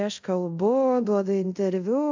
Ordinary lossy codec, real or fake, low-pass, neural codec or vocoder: AAC, 48 kbps; fake; 7.2 kHz; codec, 24 kHz, 0.5 kbps, DualCodec